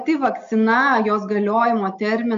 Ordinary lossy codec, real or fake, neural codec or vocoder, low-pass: AAC, 64 kbps; real; none; 7.2 kHz